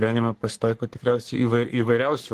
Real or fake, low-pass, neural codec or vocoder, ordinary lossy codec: fake; 14.4 kHz; codec, 44.1 kHz, 3.4 kbps, Pupu-Codec; Opus, 16 kbps